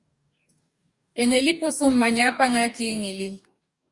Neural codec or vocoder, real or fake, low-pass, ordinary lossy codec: codec, 44.1 kHz, 2.6 kbps, DAC; fake; 10.8 kHz; Opus, 64 kbps